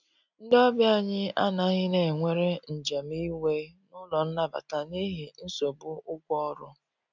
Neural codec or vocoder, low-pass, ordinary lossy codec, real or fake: none; 7.2 kHz; none; real